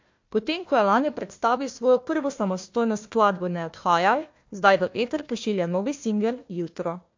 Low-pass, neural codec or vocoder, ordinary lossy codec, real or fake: 7.2 kHz; codec, 16 kHz, 1 kbps, FunCodec, trained on Chinese and English, 50 frames a second; MP3, 48 kbps; fake